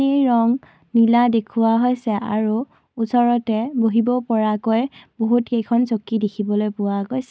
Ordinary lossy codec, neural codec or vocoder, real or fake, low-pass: none; none; real; none